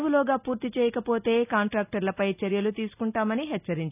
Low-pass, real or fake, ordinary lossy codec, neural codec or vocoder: 3.6 kHz; real; none; none